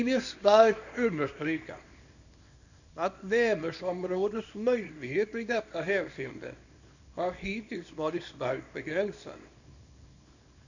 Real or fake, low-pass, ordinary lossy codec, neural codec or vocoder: fake; 7.2 kHz; none; codec, 24 kHz, 0.9 kbps, WavTokenizer, small release